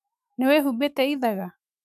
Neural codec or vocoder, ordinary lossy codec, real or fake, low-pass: autoencoder, 48 kHz, 128 numbers a frame, DAC-VAE, trained on Japanese speech; none; fake; 14.4 kHz